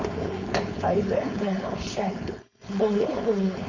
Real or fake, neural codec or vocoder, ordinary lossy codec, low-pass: fake; codec, 16 kHz, 4.8 kbps, FACodec; MP3, 64 kbps; 7.2 kHz